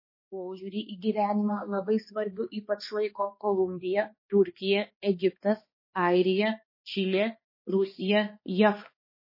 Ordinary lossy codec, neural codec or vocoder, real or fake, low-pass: MP3, 24 kbps; codec, 16 kHz, 2 kbps, X-Codec, HuBERT features, trained on balanced general audio; fake; 5.4 kHz